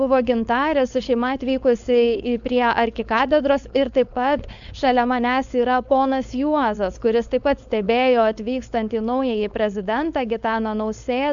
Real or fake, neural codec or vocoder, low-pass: fake; codec, 16 kHz, 4.8 kbps, FACodec; 7.2 kHz